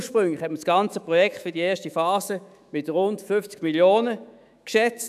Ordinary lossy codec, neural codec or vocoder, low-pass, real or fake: none; autoencoder, 48 kHz, 128 numbers a frame, DAC-VAE, trained on Japanese speech; 14.4 kHz; fake